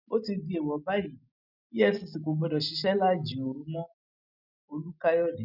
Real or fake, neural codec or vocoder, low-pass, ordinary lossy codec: real; none; 5.4 kHz; none